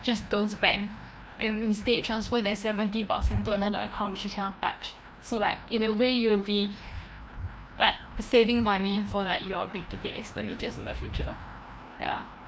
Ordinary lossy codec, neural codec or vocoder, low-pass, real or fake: none; codec, 16 kHz, 1 kbps, FreqCodec, larger model; none; fake